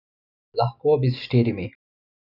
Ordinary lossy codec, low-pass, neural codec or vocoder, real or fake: none; 5.4 kHz; none; real